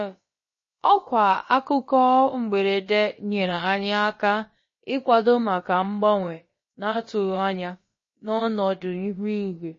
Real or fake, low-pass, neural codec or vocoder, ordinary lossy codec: fake; 7.2 kHz; codec, 16 kHz, about 1 kbps, DyCAST, with the encoder's durations; MP3, 32 kbps